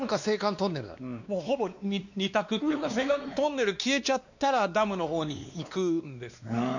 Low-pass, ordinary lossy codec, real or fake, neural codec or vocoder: 7.2 kHz; none; fake; codec, 16 kHz, 2 kbps, X-Codec, WavLM features, trained on Multilingual LibriSpeech